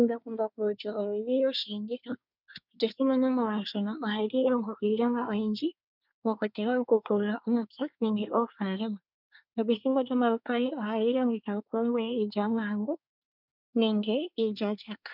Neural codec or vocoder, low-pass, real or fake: codec, 24 kHz, 1 kbps, SNAC; 5.4 kHz; fake